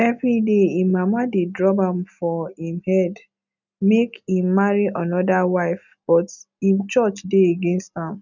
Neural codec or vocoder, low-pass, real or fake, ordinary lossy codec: none; 7.2 kHz; real; none